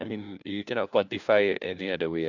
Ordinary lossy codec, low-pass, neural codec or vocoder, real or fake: none; 7.2 kHz; codec, 16 kHz, 1 kbps, FunCodec, trained on LibriTTS, 50 frames a second; fake